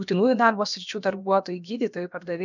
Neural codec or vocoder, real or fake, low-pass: codec, 16 kHz, about 1 kbps, DyCAST, with the encoder's durations; fake; 7.2 kHz